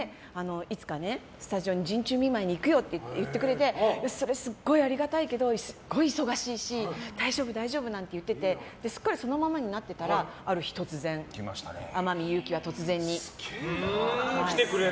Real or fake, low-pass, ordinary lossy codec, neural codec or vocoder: real; none; none; none